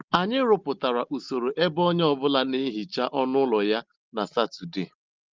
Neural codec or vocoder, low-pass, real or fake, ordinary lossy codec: none; 7.2 kHz; real; Opus, 32 kbps